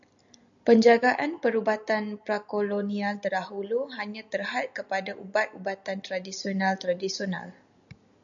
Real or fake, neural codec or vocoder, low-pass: real; none; 7.2 kHz